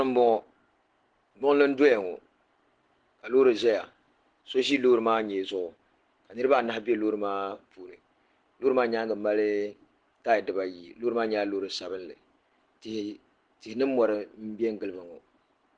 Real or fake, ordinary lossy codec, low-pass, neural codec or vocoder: real; Opus, 16 kbps; 9.9 kHz; none